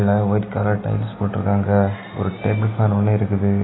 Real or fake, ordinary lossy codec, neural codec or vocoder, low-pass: real; AAC, 16 kbps; none; 7.2 kHz